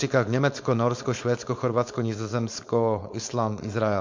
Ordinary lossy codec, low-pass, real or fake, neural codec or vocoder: MP3, 48 kbps; 7.2 kHz; fake; codec, 16 kHz, 4.8 kbps, FACodec